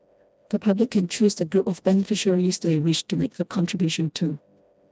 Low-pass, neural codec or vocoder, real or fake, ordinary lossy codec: none; codec, 16 kHz, 1 kbps, FreqCodec, smaller model; fake; none